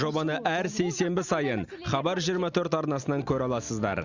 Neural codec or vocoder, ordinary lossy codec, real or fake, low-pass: none; Opus, 64 kbps; real; 7.2 kHz